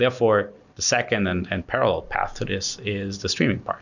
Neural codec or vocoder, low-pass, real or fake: none; 7.2 kHz; real